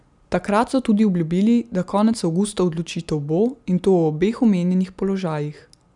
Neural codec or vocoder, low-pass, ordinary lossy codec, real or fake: none; 10.8 kHz; none; real